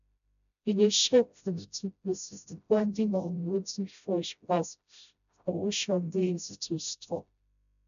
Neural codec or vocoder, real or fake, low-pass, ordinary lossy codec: codec, 16 kHz, 0.5 kbps, FreqCodec, smaller model; fake; 7.2 kHz; none